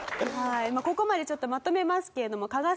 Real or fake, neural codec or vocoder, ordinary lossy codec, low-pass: real; none; none; none